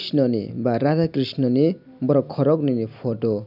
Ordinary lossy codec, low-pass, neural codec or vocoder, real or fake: none; 5.4 kHz; none; real